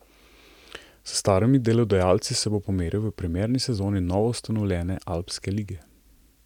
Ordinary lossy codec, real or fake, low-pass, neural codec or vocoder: none; real; 19.8 kHz; none